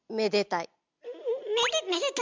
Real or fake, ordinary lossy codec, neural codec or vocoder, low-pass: real; MP3, 64 kbps; none; 7.2 kHz